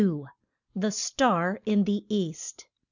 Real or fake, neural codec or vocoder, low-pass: real; none; 7.2 kHz